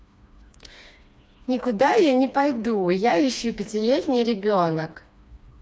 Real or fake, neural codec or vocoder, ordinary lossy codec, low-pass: fake; codec, 16 kHz, 2 kbps, FreqCodec, smaller model; none; none